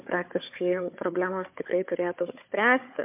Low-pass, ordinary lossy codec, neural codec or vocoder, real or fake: 3.6 kHz; MP3, 32 kbps; codec, 16 kHz, 4 kbps, FunCodec, trained on Chinese and English, 50 frames a second; fake